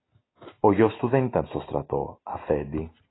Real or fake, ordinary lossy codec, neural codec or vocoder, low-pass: real; AAC, 16 kbps; none; 7.2 kHz